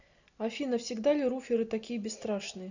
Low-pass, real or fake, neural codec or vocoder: 7.2 kHz; real; none